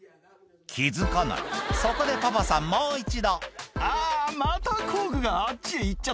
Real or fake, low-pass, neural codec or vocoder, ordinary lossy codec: real; none; none; none